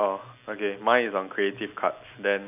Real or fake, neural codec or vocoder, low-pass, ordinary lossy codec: real; none; 3.6 kHz; none